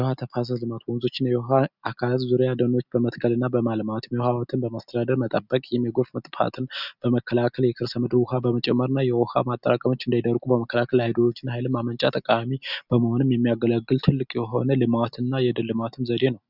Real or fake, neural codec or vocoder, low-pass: real; none; 5.4 kHz